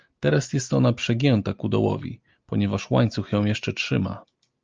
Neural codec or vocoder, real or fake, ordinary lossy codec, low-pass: none; real; Opus, 24 kbps; 7.2 kHz